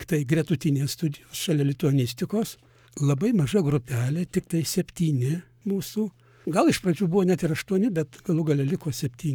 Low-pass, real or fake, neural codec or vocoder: 19.8 kHz; fake; codec, 44.1 kHz, 7.8 kbps, Pupu-Codec